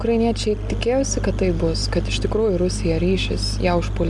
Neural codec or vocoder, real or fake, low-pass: none; real; 10.8 kHz